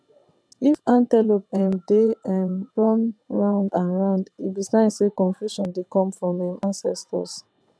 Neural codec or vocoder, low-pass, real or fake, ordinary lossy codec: vocoder, 22.05 kHz, 80 mel bands, WaveNeXt; none; fake; none